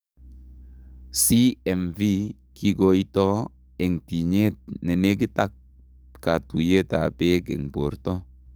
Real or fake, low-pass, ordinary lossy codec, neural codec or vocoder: fake; none; none; codec, 44.1 kHz, 7.8 kbps, DAC